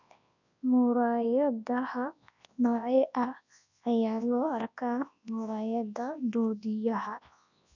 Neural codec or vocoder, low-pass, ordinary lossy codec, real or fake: codec, 24 kHz, 0.9 kbps, WavTokenizer, large speech release; 7.2 kHz; none; fake